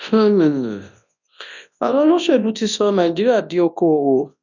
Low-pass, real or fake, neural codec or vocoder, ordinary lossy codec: 7.2 kHz; fake; codec, 24 kHz, 0.9 kbps, WavTokenizer, large speech release; none